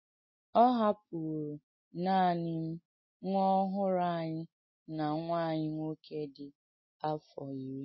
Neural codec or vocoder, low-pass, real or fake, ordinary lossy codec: none; 7.2 kHz; real; MP3, 24 kbps